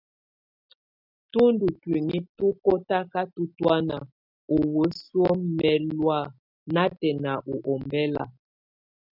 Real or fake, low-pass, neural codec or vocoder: real; 5.4 kHz; none